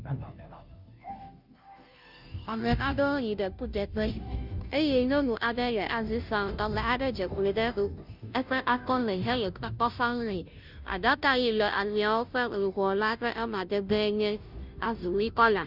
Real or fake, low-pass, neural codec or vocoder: fake; 5.4 kHz; codec, 16 kHz, 0.5 kbps, FunCodec, trained on Chinese and English, 25 frames a second